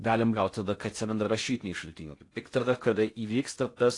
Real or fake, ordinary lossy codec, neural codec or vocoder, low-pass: fake; AAC, 48 kbps; codec, 16 kHz in and 24 kHz out, 0.6 kbps, FocalCodec, streaming, 4096 codes; 10.8 kHz